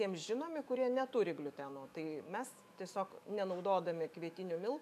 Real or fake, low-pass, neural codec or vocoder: fake; 14.4 kHz; autoencoder, 48 kHz, 128 numbers a frame, DAC-VAE, trained on Japanese speech